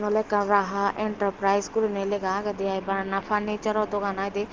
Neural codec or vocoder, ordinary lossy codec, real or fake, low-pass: none; Opus, 16 kbps; real; 7.2 kHz